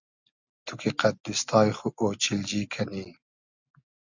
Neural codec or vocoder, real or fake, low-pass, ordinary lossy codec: none; real; 7.2 kHz; Opus, 64 kbps